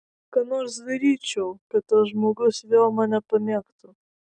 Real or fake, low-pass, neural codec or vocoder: real; 9.9 kHz; none